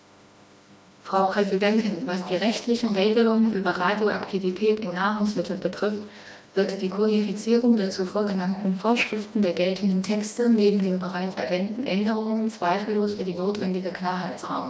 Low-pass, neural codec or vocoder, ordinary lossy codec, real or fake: none; codec, 16 kHz, 1 kbps, FreqCodec, smaller model; none; fake